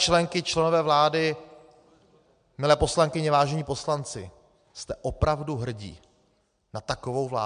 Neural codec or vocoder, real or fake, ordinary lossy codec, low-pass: none; real; MP3, 64 kbps; 9.9 kHz